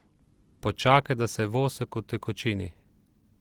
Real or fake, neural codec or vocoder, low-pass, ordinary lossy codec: real; none; 19.8 kHz; Opus, 16 kbps